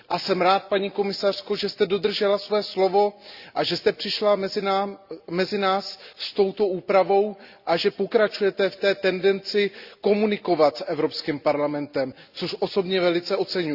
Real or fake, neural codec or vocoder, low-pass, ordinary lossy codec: real; none; 5.4 kHz; Opus, 64 kbps